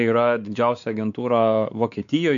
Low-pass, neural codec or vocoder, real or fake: 7.2 kHz; codec, 16 kHz, 4 kbps, X-Codec, WavLM features, trained on Multilingual LibriSpeech; fake